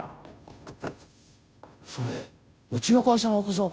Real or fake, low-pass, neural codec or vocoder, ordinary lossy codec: fake; none; codec, 16 kHz, 0.5 kbps, FunCodec, trained on Chinese and English, 25 frames a second; none